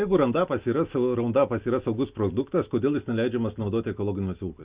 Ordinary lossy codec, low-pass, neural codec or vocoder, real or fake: Opus, 64 kbps; 3.6 kHz; none; real